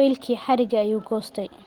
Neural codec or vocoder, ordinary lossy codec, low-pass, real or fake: none; Opus, 16 kbps; 19.8 kHz; real